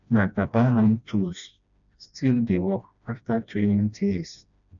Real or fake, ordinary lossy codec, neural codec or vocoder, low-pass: fake; none; codec, 16 kHz, 1 kbps, FreqCodec, smaller model; 7.2 kHz